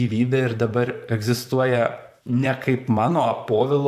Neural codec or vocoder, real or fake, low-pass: vocoder, 44.1 kHz, 128 mel bands, Pupu-Vocoder; fake; 14.4 kHz